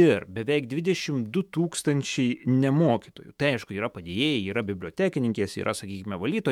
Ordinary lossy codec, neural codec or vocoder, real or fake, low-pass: MP3, 96 kbps; autoencoder, 48 kHz, 128 numbers a frame, DAC-VAE, trained on Japanese speech; fake; 19.8 kHz